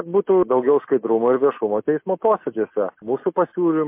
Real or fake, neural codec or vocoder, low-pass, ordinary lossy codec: real; none; 3.6 kHz; MP3, 24 kbps